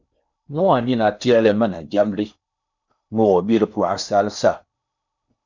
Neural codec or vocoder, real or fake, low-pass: codec, 16 kHz in and 24 kHz out, 0.8 kbps, FocalCodec, streaming, 65536 codes; fake; 7.2 kHz